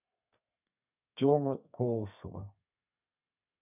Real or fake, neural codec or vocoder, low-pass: fake; codec, 32 kHz, 1.9 kbps, SNAC; 3.6 kHz